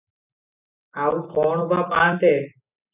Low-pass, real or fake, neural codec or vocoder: 3.6 kHz; real; none